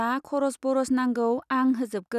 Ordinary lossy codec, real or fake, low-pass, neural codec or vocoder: none; real; 14.4 kHz; none